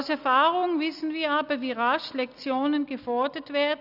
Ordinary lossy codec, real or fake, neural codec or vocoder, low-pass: none; real; none; 5.4 kHz